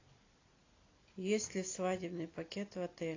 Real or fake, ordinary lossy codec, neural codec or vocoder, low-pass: real; AAC, 32 kbps; none; 7.2 kHz